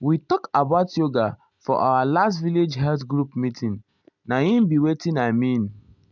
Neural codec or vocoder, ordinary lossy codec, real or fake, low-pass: none; none; real; 7.2 kHz